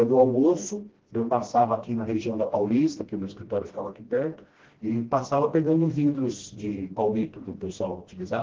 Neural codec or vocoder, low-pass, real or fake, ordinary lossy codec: codec, 16 kHz, 1 kbps, FreqCodec, smaller model; 7.2 kHz; fake; Opus, 16 kbps